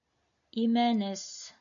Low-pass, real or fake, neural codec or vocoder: 7.2 kHz; real; none